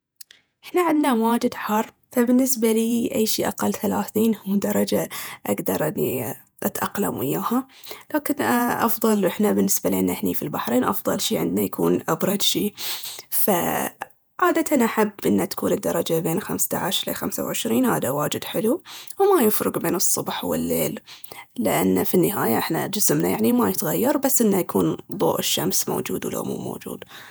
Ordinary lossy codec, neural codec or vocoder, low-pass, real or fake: none; vocoder, 48 kHz, 128 mel bands, Vocos; none; fake